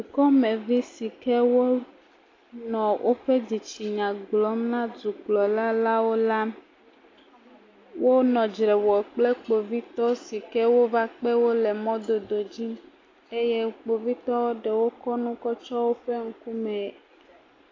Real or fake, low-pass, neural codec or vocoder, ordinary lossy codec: real; 7.2 kHz; none; AAC, 32 kbps